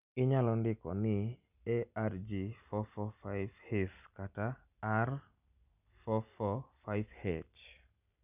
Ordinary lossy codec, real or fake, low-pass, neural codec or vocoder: none; real; 3.6 kHz; none